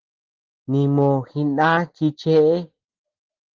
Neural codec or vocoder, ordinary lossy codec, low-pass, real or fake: none; Opus, 16 kbps; 7.2 kHz; real